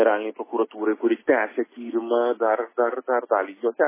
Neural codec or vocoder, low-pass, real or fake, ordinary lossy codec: none; 3.6 kHz; real; MP3, 16 kbps